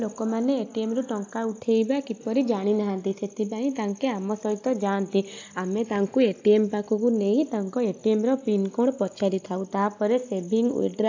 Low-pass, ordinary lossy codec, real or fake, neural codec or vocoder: 7.2 kHz; AAC, 48 kbps; real; none